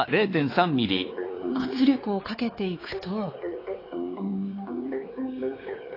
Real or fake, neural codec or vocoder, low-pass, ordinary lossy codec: fake; codec, 16 kHz, 4 kbps, X-Codec, WavLM features, trained on Multilingual LibriSpeech; 5.4 kHz; AAC, 24 kbps